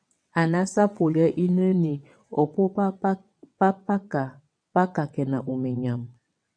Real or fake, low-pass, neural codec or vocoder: fake; 9.9 kHz; vocoder, 22.05 kHz, 80 mel bands, WaveNeXt